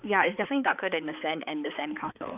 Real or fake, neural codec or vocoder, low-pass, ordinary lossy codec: fake; codec, 16 kHz, 4 kbps, X-Codec, HuBERT features, trained on balanced general audio; 3.6 kHz; none